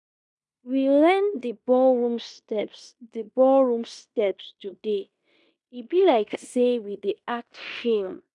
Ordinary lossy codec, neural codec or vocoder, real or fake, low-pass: none; codec, 16 kHz in and 24 kHz out, 0.9 kbps, LongCat-Audio-Codec, fine tuned four codebook decoder; fake; 10.8 kHz